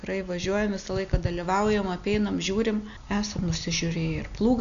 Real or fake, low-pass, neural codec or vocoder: real; 7.2 kHz; none